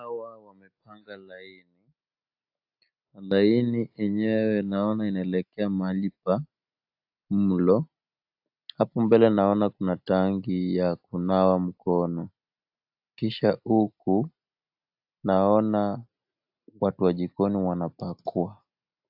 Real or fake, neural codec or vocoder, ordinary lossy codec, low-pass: real; none; AAC, 48 kbps; 5.4 kHz